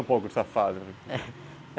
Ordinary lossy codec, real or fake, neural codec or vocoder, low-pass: none; real; none; none